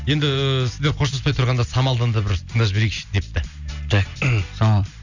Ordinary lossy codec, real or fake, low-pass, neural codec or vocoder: none; real; 7.2 kHz; none